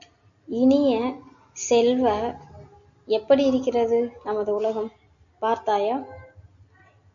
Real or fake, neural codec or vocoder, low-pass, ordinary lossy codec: real; none; 7.2 kHz; MP3, 96 kbps